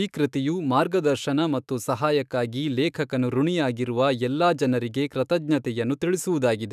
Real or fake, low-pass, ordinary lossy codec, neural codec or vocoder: fake; 14.4 kHz; none; autoencoder, 48 kHz, 128 numbers a frame, DAC-VAE, trained on Japanese speech